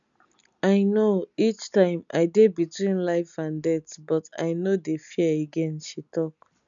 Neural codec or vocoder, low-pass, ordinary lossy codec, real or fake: none; 7.2 kHz; none; real